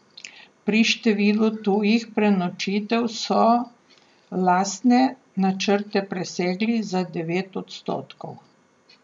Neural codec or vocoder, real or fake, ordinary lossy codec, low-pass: none; real; none; 9.9 kHz